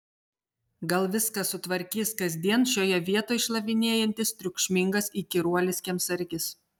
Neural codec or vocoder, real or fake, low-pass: none; real; 19.8 kHz